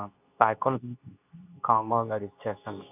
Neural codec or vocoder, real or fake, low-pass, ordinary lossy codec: codec, 24 kHz, 0.9 kbps, WavTokenizer, medium speech release version 2; fake; 3.6 kHz; none